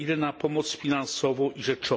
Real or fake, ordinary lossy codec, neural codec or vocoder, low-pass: real; none; none; none